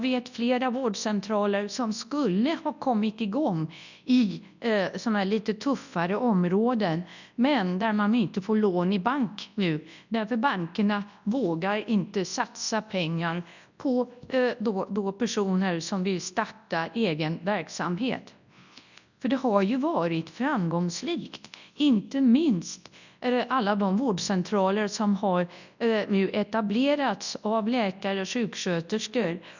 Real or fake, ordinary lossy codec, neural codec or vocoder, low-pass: fake; none; codec, 24 kHz, 0.9 kbps, WavTokenizer, large speech release; 7.2 kHz